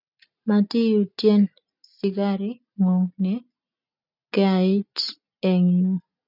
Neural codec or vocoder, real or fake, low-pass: none; real; 5.4 kHz